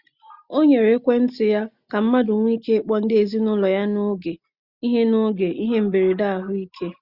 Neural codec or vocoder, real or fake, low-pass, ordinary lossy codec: none; real; 5.4 kHz; Opus, 64 kbps